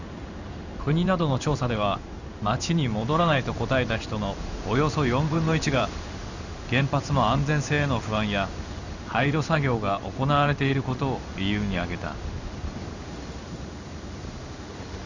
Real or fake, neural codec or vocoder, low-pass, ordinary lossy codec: fake; codec, 16 kHz in and 24 kHz out, 1 kbps, XY-Tokenizer; 7.2 kHz; none